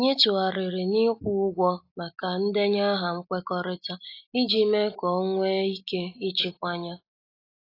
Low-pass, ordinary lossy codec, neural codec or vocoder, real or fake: 5.4 kHz; AAC, 32 kbps; none; real